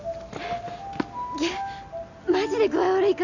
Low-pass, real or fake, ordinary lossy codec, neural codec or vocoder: 7.2 kHz; real; none; none